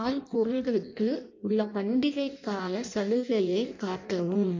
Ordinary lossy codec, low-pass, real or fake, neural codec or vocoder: MP3, 48 kbps; 7.2 kHz; fake; codec, 16 kHz in and 24 kHz out, 0.6 kbps, FireRedTTS-2 codec